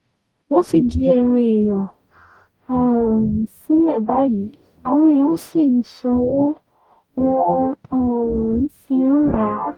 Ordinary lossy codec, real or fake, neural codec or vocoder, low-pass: Opus, 32 kbps; fake; codec, 44.1 kHz, 0.9 kbps, DAC; 19.8 kHz